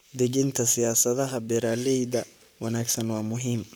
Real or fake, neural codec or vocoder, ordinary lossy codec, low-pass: fake; codec, 44.1 kHz, 7.8 kbps, Pupu-Codec; none; none